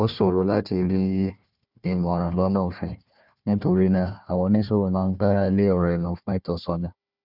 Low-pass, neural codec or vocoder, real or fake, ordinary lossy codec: 5.4 kHz; codec, 16 kHz, 1 kbps, FunCodec, trained on Chinese and English, 50 frames a second; fake; none